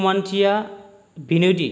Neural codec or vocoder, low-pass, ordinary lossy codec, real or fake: none; none; none; real